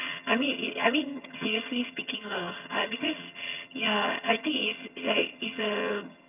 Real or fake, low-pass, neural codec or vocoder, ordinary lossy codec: fake; 3.6 kHz; vocoder, 22.05 kHz, 80 mel bands, HiFi-GAN; none